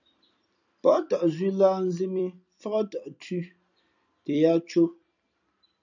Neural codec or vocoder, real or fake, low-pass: vocoder, 44.1 kHz, 128 mel bands every 256 samples, BigVGAN v2; fake; 7.2 kHz